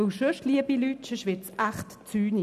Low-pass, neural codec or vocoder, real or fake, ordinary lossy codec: 14.4 kHz; none; real; none